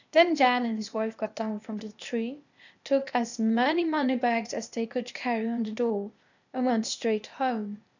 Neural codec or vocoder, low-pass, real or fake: codec, 16 kHz, 0.8 kbps, ZipCodec; 7.2 kHz; fake